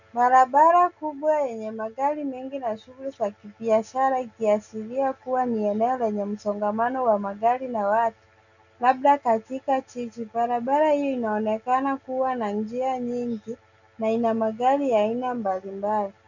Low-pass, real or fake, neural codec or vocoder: 7.2 kHz; real; none